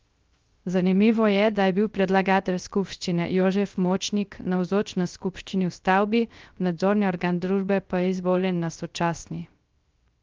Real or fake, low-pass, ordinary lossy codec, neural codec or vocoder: fake; 7.2 kHz; Opus, 24 kbps; codec, 16 kHz, 0.3 kbps, FocalCodec